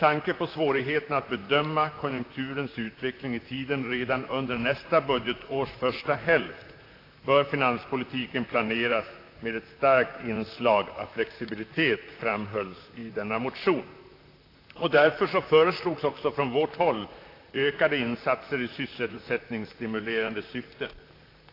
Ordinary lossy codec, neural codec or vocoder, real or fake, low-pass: AAC, 32 kbps; vocoder, 44.1 kHz, 128 mel bands, Pupu-Vocoder; fake; 5.4 kHz